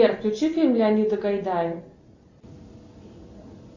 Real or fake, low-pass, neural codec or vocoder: real; 7.2 kHz; none